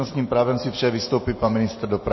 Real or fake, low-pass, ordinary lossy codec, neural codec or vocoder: real; 7.2 kHz; MP3, 24 kbps; none